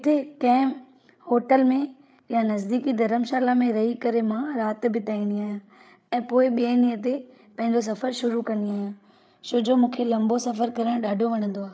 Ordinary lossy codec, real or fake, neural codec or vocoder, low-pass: none; fake; codec, 16 kHz, 8 kbps, FreqCodec, larger model; none